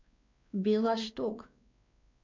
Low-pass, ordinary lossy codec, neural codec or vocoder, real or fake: 7.2 kHz; MP3, 64 kbps; codec, 16 kHz, 2 kbps, X-Codec, WavLM features, trained on Multilingual LibriSpeech; fake